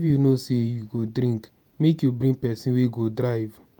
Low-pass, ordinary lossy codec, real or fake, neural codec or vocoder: none; none; fake; vocoder, 48 kHz, 128 mel bands, Vocos